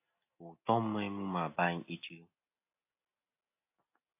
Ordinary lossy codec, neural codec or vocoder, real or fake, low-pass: AAC, 32 kbps; none; real; 3.6 kHz